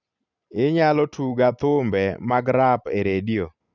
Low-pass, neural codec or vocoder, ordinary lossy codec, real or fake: 7.2 kHz; none; none; real